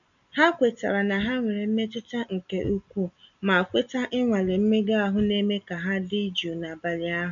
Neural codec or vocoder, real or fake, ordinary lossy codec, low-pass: none; real; none; 7.2 kHz